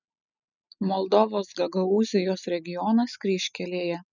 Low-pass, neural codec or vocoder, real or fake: 7.2 kHz; none; real